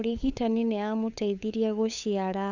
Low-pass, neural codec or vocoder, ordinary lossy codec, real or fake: 7.2 kHz; codec, 16 kHz, 8 kbps, FunCodec, trained on LibriTTS, 25 frames a second; Opus, 64 kbps; fake